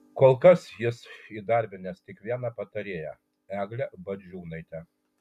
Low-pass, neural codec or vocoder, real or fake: 14.4 kHz; none; real